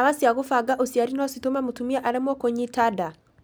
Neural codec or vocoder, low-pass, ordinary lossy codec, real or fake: none; none; none; real